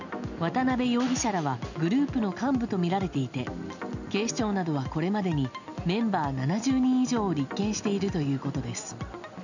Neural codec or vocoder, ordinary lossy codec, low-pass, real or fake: none; none; 7.2 kHz; real